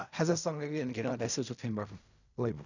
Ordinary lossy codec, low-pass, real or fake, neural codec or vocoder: none; 7.2 kHz; fake; codec, 16 kHz in and 24 kHz out, 0.4 kbps, LongCat-Audio-Codec, fine tuned four codebook decoder